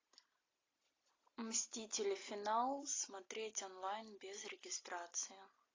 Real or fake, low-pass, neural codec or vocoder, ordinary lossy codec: real; 7.2 kHz; none; AAC, 32 kbps